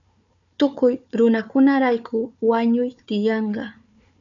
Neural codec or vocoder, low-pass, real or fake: codec, 16 kHz, 4 kbps, FunCodec, trained on Chinese and English, 50 frames a second; 7.2 kHz; fake